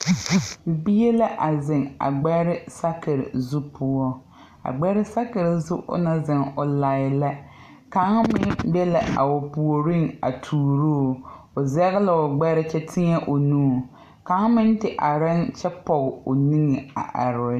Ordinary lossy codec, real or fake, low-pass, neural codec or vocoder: AAC, 96 kbps; real; 14.4 kHz; none